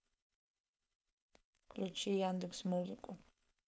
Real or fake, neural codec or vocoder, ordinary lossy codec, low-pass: fake; codec, 16 kHz, 4.8 kbps, FACodec; none; none